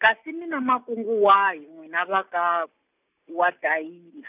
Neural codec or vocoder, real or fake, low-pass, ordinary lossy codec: none; real; 3.6 kHz; none